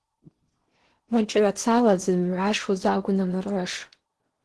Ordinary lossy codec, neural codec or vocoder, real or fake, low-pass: Opus, 16 kbps; codec, 16 kHz in and 24 kHz out, 0.8 kbps, FocalCodec, streaming, 65536 codes; fake; 10.8 kHz